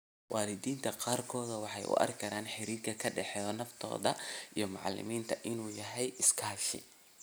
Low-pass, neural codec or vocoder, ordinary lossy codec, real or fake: none; none; none; real